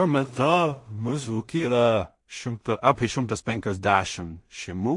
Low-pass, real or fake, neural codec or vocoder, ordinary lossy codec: 10.8 kHz; fake; codec, 16 kHz in and 24 kHz out, 0.4 kbps, LongCat-Audio-Codec, two codebook decoder; MP3, 48 kbps